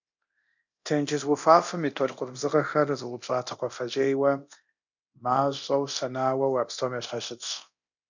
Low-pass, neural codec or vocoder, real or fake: 7.2 kHz; codec, 24 kHz, 0.9 kbps, DualCodec; fake